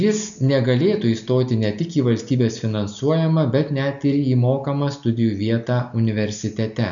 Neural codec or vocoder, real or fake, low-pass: none; real; 7.2 kHz